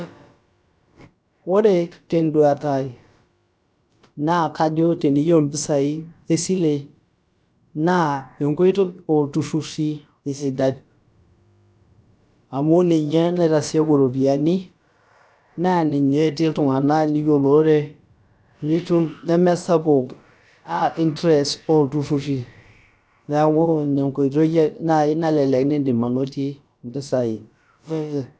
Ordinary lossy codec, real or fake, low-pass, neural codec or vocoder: none; fake; none; codec, 16 kHz, about 1 kbps, DyCAST, with the encoder's durations